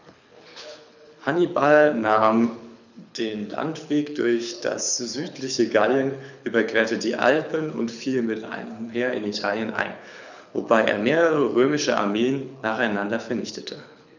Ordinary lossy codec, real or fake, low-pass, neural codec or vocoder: none; fake; 7.2 kHz; codec, 24 kHz, 6 kbps, HILCodec